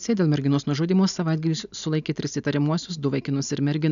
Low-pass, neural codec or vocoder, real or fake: 7.2 kHz; none; real